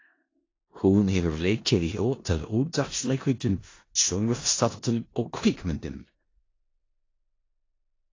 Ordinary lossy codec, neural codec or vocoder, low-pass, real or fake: AAC, 32 kbps; codec, 16 kHz in and 24 kHz out, 0.4 kbps, LongCat-Audio-Codec, four codebook decoder; 7.2 kHz; fake